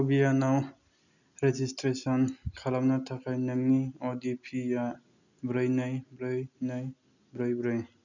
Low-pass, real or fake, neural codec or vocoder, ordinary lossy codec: 7.2 kHz; real; none; none